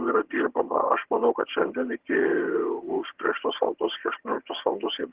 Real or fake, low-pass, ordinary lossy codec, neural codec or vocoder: fake; 3.6 kHz; Opus, 16 kbps; vocoder, 22.05 kHz, 80 mel bands, HiFi-GAN